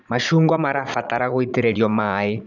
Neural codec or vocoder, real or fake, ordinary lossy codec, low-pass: vocoder, 44.1 kHz, 80 mel bands, Vocos; fake; none; 7.2 kHz